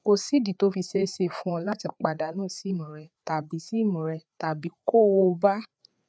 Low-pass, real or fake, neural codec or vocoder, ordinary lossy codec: none; fake; codec, 16 kHz, 4 kbps, FreqCodec, larger model; none